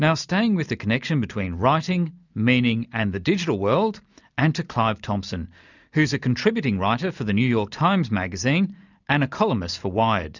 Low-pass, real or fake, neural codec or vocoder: 7.2 kHz; real; none